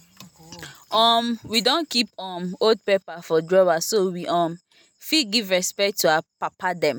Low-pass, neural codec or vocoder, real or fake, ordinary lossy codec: none; none; real; none